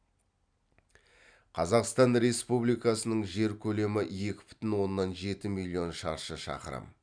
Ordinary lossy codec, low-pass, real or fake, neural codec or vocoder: none; 9.9 kHz; fake; vocoder, 44.1 kHz, 128 mel bands every 256 samples, BigVGAN v2